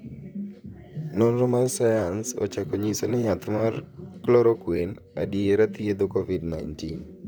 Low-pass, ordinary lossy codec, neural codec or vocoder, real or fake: none; none; vocoder, 44.1 kHz, 128 mel bands, Pupu-Vocoder; fake